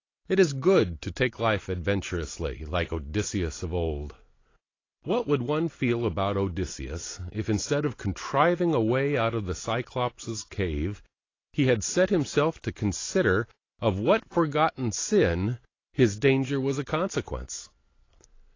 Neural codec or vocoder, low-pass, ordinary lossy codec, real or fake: none; 7.2 kHz; AAC, 32 kbps; real